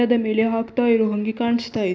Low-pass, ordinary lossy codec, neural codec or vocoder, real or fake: none; none; none; real